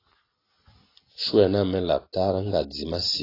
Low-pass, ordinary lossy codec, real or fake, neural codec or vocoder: 5.4 kHz; AAC, 24 kbps; real; none